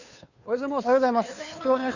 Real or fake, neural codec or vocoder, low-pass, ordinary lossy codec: fake; codec, 16 kHz, 2 kbps, FunCodec, trained on Chinese and English, 25 frames a second; 7.2 kHz; none